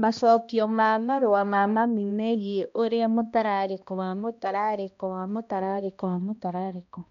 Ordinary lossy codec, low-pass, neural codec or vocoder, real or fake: MP3, 64 kbps; 7.2 kHz; codec, 16 kHz, 1 kbps, X-Codec, HuBERT features, trained on balanced general audio; fake